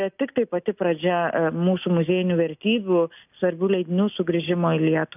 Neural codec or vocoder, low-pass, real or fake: none; 3.6 kHz; real